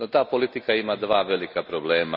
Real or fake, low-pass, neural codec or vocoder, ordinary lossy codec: real; 5.4 kHz; none; none